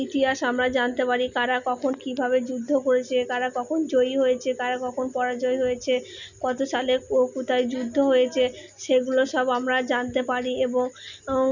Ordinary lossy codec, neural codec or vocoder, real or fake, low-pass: none; none; real; 7.2 kHz